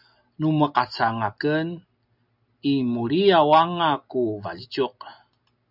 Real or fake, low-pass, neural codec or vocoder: real; 5.4 kHz; none